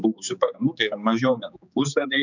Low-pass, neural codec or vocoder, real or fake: 7.2 kHz; codec, 16 kHz, 4 kbps, X-Codec, HuBERT features, trained on general audio; fake